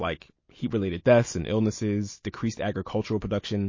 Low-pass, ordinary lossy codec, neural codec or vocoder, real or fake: 7.2 kHz; MP3, 32 kbps; none; real